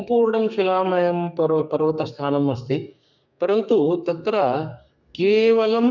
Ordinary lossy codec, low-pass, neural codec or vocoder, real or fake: none; 7.2 kHz; codec, 32 kHz, 1.9 kbps, SNAC; fake